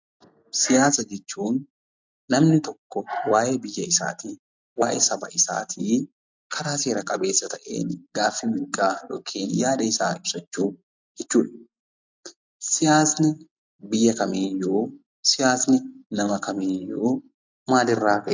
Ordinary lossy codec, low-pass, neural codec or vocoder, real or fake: MP3, 64 kbps; 7.2 kHz; none; real